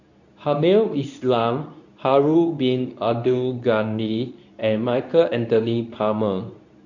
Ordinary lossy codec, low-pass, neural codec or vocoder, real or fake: none; 7.2 kHz; codec, 24 kHz, 0.9 kbps, WavTokenizer, medium speech release version 2; fake